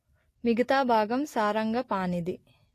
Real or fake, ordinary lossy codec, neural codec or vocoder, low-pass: real; AAC, 48 kbps; none; 14.4 kHz